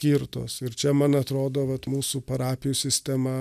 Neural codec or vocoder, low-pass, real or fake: none; 14.4 kHz; real